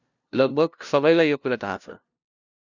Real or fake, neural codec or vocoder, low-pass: fake; codec, 16 kHz, 0.5 kbps, FunCodec, trained on LibriTTS, 25 frames a second; 7.2 kHz